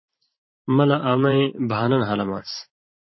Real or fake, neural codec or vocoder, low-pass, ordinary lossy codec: fake; vocoder, 44.1 kHz, 128 mel bands every 512 samples, BigVGAN v2; 7.2 kHz; MP3, 24 kbps